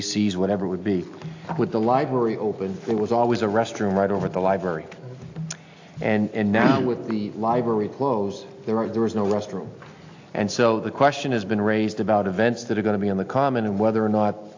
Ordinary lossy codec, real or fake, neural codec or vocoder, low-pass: AAC, 48 kbps; real; none; 7.2 kHz